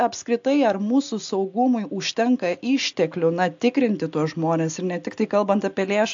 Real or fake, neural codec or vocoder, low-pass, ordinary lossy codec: real; none; 7.2 kHz; AAC, 64 kbps